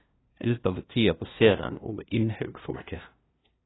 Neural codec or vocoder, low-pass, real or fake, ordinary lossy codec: codec, 16 kHz, 0.5 kbps, FunCodec, trained on LibriTTS, 25 frames a second; 7.2 kHz; fake; AAC, 16 kbps